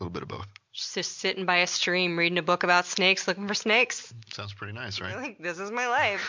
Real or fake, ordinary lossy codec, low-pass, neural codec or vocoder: real; MP3, 64 kbps; 7.2 kHz; none